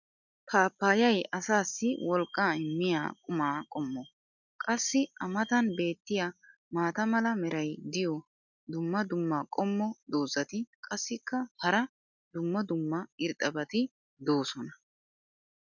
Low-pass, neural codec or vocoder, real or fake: 7.2 kHz; none; real